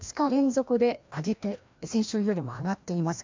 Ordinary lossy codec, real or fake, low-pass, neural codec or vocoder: MP3, 64 kbps; fake; 7.2 kHz; codec, 16 kHz, 1 kbps, FreqCodec, larger model